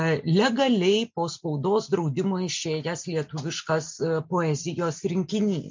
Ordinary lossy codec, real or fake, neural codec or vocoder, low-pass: MP3, 64 kbps; real; none; 7.2 kHz